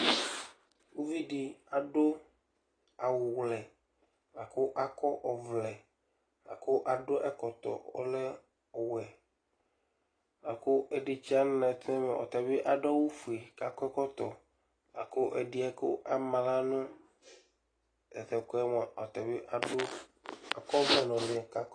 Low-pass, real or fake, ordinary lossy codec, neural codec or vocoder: 9.9 kHz; real; AAC, 32 kbps; none